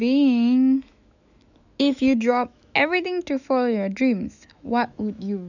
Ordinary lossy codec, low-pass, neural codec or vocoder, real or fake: none; 7.2 kHz; autoencoder, 48 kHz, 128 numbers a frame, DAC-VAE, trained on Japanese speech; fake